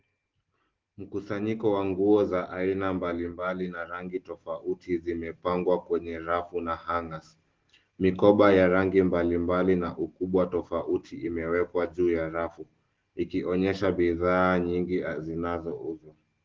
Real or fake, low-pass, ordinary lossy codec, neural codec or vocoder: real; 7.2 kHz; Opus, 16 kbps; none